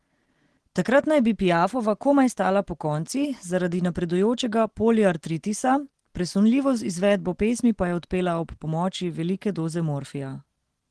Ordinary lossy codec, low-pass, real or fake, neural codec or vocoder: Opus, 16 kbps; 10.8 kHz; real; none